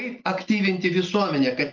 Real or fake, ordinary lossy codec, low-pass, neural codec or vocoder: real; Opus, 32 kbps; 7.2 kHz; none